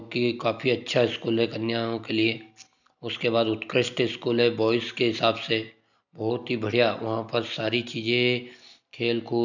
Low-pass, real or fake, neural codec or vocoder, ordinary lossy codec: 7.2 kHz; real; none; none